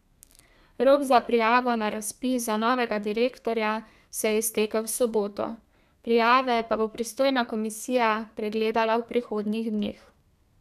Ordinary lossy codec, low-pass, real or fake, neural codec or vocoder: none; 14.4 kHz; fake; codec, 32 kHz, 1.9 kbps, SNAC